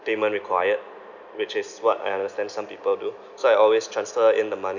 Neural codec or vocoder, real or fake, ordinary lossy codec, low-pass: none; real; none; 7.2 kHz